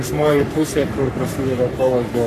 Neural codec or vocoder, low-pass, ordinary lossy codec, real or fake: codec, 44.1 kHz, 3.4 kbps, Pupu-Codec; 14.4 kHz; MP3, 96 kbps; fake